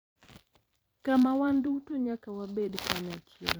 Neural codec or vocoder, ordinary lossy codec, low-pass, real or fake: vocoder, 44.1 kHz, 128 mel bands every 256 samples, BigVGAN v2; none; none; fake